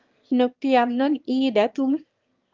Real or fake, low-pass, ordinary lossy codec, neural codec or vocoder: fake; 7.2 kHz; Opus, 24 kbps; autoencoder, 22.05 kHz, a latent of 192 numbers a frame, VITS, trained on one speaker